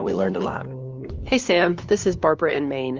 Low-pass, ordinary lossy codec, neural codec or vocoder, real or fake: 7.2 kHz; Opus, 32 kbps; codec, 16 kHz, 2 kbps, FunCodec, trained on LibriTTS, 25 frames a second; fake